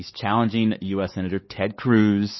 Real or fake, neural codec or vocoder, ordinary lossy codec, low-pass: fake; codec, 16 kHz, 4 kbps, X-Codec, WavLM features, trained on Multilingual LibriSpeech; MP3, 24 kbps; 7.2 kHz